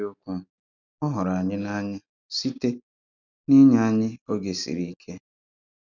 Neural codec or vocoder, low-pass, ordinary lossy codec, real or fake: none; 7.2 kHz; none; real